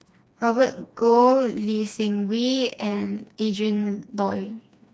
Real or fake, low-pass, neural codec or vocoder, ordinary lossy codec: fake; none; codec, 16 kHz, 2 kbps, FreqCodec, smaller model; none